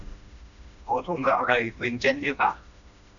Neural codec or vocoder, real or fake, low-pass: codec, 16 kHz, 1 kbps, FreqCodec, smaller model; fake; 7.2 kHz